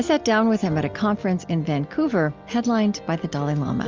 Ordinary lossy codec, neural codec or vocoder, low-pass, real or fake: Opus, 32 kbps; none; 7.2 kHz; real